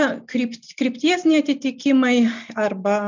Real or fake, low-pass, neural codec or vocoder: real; 7.2 kHz; none